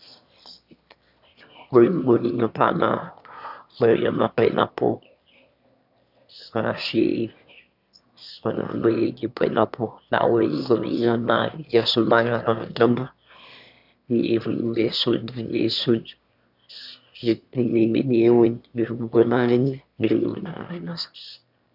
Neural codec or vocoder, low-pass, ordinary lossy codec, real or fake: autoencoder, 22.05 kHz, a latent of 192 numbers a frame, VITS, trained on one speaker; 5.4 kHz; none; fake